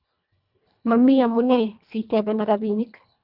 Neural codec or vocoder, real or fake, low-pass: codec, 24 kHz, 1.5 kbps, HILCodec; fake; 5.4 kHz